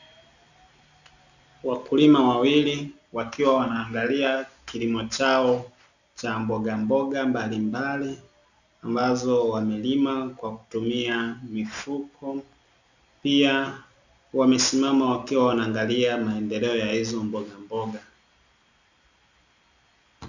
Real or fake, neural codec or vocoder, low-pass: real; none; 7.2 kHz